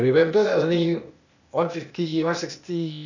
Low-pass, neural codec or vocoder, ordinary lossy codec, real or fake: 7.2 kHz; codec, 16 kHz, 0.8 kbps, ZipCodec; none; fake